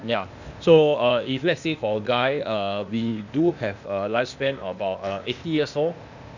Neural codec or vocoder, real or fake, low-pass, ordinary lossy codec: codec, 16 kHz, 0.8 kbps, ZipCodec; fake; 7.2 kHz; none